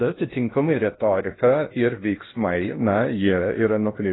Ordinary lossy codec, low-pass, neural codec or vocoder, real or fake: AAC, 16 kbps; 7.2 kHz; codec, 16 kHz in and 24 kHz out, 0.6 kbps, FocalCodec, streaming, 2048 codes; fake